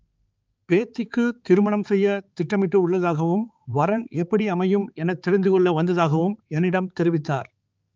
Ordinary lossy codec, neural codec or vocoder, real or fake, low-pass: Opus, 24 kbps; codec, 16 kHz, 4 kbps, X-Codec, HuBERT features, trained on balanced general audio; fake; 7.2 kHz